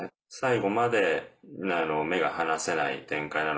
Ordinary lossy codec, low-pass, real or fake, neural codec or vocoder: none; none; real; none